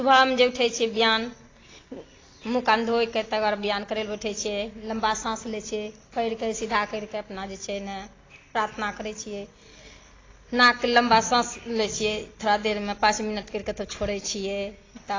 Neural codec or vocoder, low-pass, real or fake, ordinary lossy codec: none; 7.2 kHz; real; AAC, 32 kbps